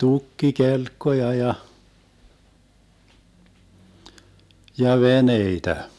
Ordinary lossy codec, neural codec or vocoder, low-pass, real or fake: none; none; none; real